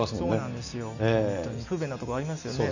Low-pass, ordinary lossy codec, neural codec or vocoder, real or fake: 7.2 kHz; none; none; real